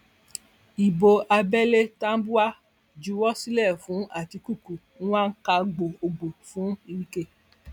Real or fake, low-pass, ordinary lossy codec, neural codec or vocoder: real; 19.8 kHz; none; none